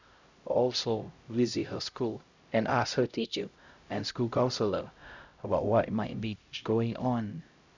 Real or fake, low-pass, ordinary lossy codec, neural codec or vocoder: fake; 7.2 kHz; Opus, 64 kbps; codec, 16 kHz, 0.5 kbps, X-Codec, HuBERT features, trained on LibriSpeech